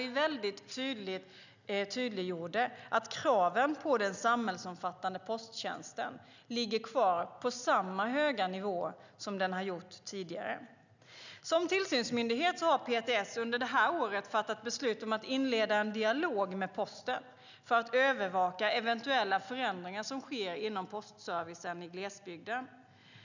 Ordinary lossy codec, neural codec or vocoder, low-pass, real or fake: none; none; 7.2 kHz; real